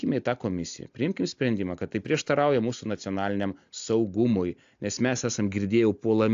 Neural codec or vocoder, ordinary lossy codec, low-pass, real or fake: none; AAC, 64 kbps; 7.2 kHz; real